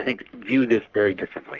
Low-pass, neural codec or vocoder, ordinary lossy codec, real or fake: 7.2 kHz; codec, 44.1 kHz, 3.4 kbps, Pupu-Codec; Opus, 24 kbps; fake